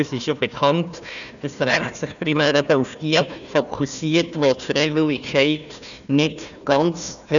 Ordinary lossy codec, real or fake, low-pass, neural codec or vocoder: none; fake; 7.2 kHz; codec, 16 kHz, 1 kbps, FunCodec, trained on Chinese and English, 50 frames a second